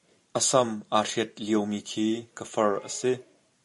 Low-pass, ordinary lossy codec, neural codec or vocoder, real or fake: 14.4 kHz; MP3, 48 kbps; vocoder, 44.1 kHz, 128 mel bands every 512 samples, BigVGAN v2; fake